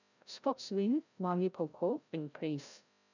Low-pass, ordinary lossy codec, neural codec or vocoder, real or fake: 7.2 kHz; none; codec, 16 kHz, 0.5 kbps, FreqCodec, larger model; fake